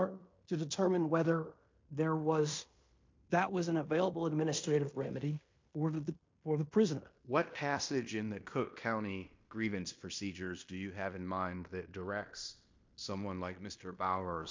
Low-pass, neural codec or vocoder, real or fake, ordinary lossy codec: 7.2 kHz; codec, 16 kHz in and 24 kHz out, 0.9 kbps, LongCat-Audio-Codec, fine tuned four codebook decoder; fake; MP3, 48 kbps